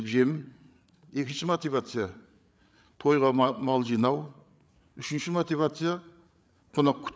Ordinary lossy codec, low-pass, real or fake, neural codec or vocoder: none; none; fake; codec, 16 kHz, 8 kbps, FreqCodec, larger model